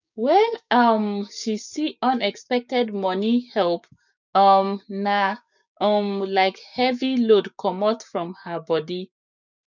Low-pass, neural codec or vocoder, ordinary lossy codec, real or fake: 7.2 kHz; codec, 16 kHz, 6 kbps, DAC; none; fake